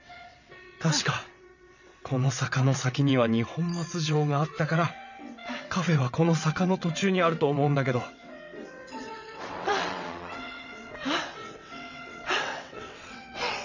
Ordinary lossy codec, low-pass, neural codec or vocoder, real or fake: none; 7.2 kHz; vocoder, 22.05 kHz, 80 mel bands, WaveNeXt; fake